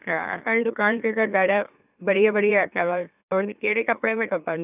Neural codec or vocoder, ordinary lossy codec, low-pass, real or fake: autoencoder, 44.1 kHz, a latent of 192 numbers a frame, MeloTTS; none; 3.6 kHz; fake